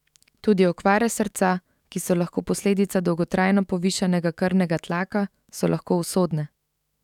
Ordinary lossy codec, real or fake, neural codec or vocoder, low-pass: none; fake; autoencoder, 48 kHz, 128 numbers a frame, DAC-VAE, trained on Japanese speech; 19.8 kHz